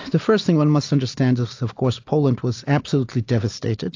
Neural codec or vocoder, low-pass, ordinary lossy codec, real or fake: none; 7.2 kHz; AAC, 48 kbps; real